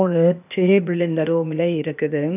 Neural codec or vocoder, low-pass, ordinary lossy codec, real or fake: codec, 16 kHz, 0.8 kbps, ZipCodec; 3.6 kHz; none; fake